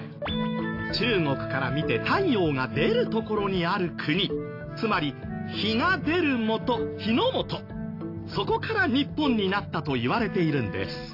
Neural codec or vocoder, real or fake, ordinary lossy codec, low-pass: none; real; AAC, 32 kbps; 5.4 kHz